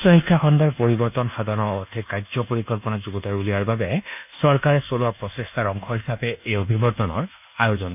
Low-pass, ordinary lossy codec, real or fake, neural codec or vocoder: 3.6 kHz; none; fake; codec, 24 kHz, 1.2 kbps, DualCodec